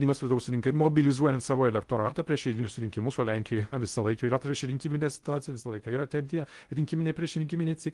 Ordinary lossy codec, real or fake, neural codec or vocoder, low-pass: Opus, 24 kbps; fake; codec, 16 kHz in and 24 kHz out, 0.6 kbps, FocalCodec, streaming, 2048 codes; 10.8 kHz